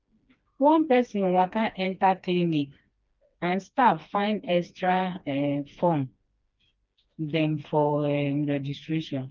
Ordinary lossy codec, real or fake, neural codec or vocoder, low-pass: Opus, 24 kbps; fake; codec, 16 kHz, 2 kbps, FreqCodec, smaller model; 7.2 kHz